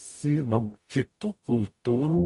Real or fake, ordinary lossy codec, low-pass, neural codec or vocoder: fake; MP3, 48 kbps; 14.4 kHz; codec, 44.1 kHz, 0.9 kbps, DAC